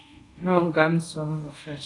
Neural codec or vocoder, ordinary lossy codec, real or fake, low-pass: codec, 24 kHz, 0.5 kbps, DualCodec; AAC, 48 kbps; fake; 10.8 kHz